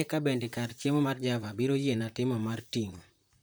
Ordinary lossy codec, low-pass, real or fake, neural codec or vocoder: none; none; fake; vocoder, 44.1 kHz, 128 mel bands, Pupu-Vocoder